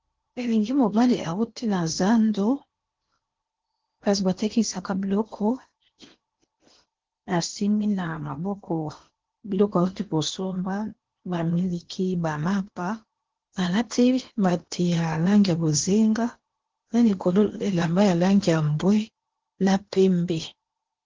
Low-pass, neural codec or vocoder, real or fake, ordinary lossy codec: 7.2 kHz; codec, 16 kHz in and 24 kHz out, 0.8 kbps, FocalCodec, streaming, 65536 codes; fake; Opus, 16 kbps